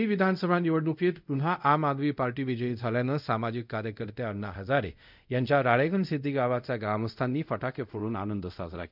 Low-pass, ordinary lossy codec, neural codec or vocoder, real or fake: 5.4 kHz; none; codec, 24 kHz, 0.5 kbps, DualCodec; fake